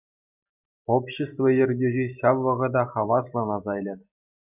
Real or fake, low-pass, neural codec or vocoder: real; 3.6 kHz; none